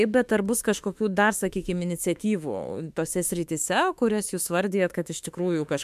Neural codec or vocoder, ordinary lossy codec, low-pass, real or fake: autoencoder, 48 kHz, 32 numbers a frame, DAC-VAE, trained on Japanese speech; MP3, 96 kbps; 14.4 kHz; fake